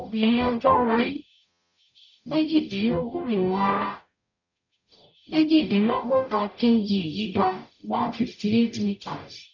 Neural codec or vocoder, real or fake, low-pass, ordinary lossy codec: codec, 44.1 kHz, 0.9 kbps, DAC; fake; 7.2 kHz; none